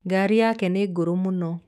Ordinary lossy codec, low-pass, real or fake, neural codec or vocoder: none; 14.4 kHz; fake; autoencoder, 48 kHz, 128 numbers a frame, DAC-VAE, trained on Japanese speech